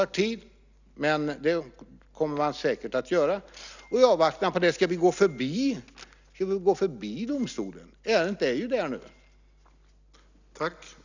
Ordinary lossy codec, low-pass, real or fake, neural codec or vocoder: none; 7.2 kHz; real; none